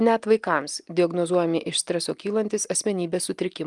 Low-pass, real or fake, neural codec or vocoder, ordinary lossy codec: 10.8 kHz; real; none; Opus, 32 kbps